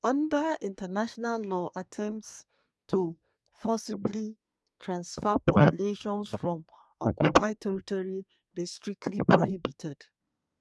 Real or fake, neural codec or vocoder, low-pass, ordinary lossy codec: fake; codec, 24 kHz, 1 kbps, SNAC; none; none